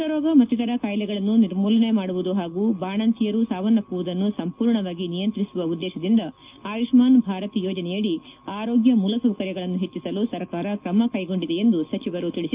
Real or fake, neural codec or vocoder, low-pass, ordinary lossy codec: real; none; 3.6 kHz; Opus, 24 kbps